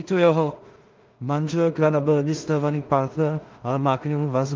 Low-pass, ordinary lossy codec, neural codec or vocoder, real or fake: 7.2 kHz; Opus, 32 kbps; codec, 16 kHz in and 24 kHz out, 0.4 kbps, LongCat-Audio-Codec, two codebook decoder; fake